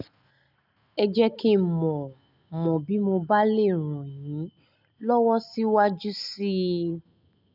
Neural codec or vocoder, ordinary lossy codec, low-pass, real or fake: none; none; 5.4 kHz; real